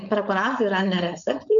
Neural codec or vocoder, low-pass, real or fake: codec, 16 kHz, 4.8 kbps, FACodec; 7.2 kHz; fake